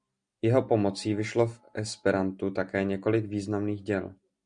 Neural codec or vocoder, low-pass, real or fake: none; 9.9 kHz; real